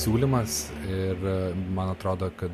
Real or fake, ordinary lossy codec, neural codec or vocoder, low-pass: real; MP3, 64 kbps; none; 14.4 kHz